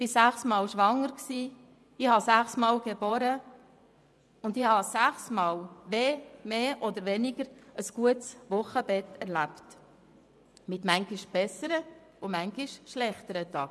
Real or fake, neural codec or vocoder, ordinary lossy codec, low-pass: fake; vocoder, 24 kHz, 100 mel bands, Vocos; none; none